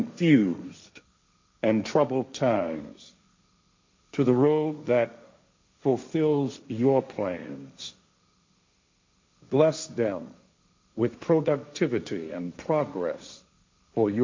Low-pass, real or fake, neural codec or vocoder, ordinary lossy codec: 7.2 kHz; fake; codec, 16 kHz, 1.1 kbps, Voila-Tokenizer; MP3, 48 kbps